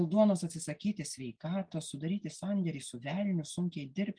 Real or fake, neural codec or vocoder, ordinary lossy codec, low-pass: real; none; Opus, 32 kbps; 9.9 kHz